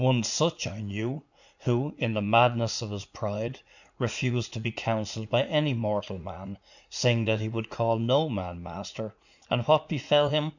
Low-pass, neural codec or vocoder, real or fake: 7.2 kHz; vocoder, 44.1 kHz, 80 mel bands, Vocos; fake